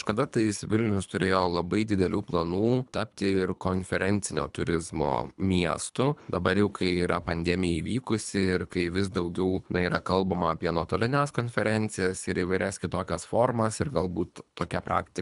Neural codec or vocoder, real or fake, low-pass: codec, 24 kHz, 3 kbps, HILCodec; fake; 10.8 kHz